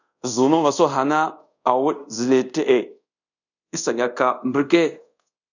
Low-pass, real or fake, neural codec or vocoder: 7.2 kHz; fake; codec, 24 kHz, 0.5 kbps, DualCodec